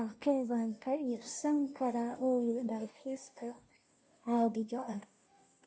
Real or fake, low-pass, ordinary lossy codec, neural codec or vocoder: fake; none; none; codec, 16 kHz, 0.5 kbps, FunCodec, trained on Chinese and English, 25 frames a second